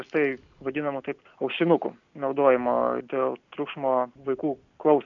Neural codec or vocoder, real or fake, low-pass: none; real; 7.2 kHz